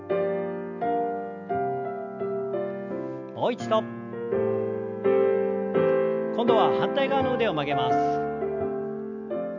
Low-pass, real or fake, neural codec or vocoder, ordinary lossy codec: 7.2 kHz; real; none; none